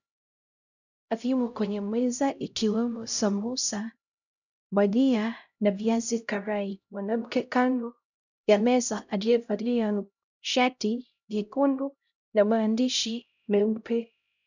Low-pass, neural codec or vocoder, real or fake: 7.2 kHz; codec, 16 kHz, 0.5 kbps, X-Codec, HuBERT features, trained on LibriSpeech; fake